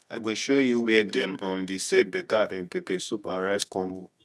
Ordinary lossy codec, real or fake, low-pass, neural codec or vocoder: none; fake; none; codec, 24 kHz, 0.9 kbps, WavTokenizer, medium music audio release